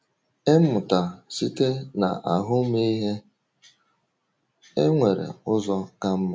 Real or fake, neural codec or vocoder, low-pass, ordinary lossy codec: real; none; none; none